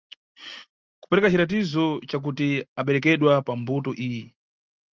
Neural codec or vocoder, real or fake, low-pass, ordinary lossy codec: none; real; 7.2 kHz; Opus, 24 kbps